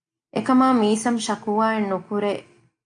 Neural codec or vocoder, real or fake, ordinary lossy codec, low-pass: none; real; AAC, 48 kbps; 9.9 kHz